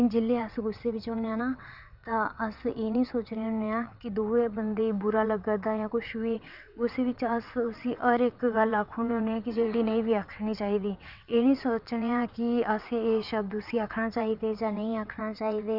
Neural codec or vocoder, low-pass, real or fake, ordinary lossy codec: vocoder, 22.05 kHz, 80 mel bands, WaveNeXt; 5.4 kHz; fake; none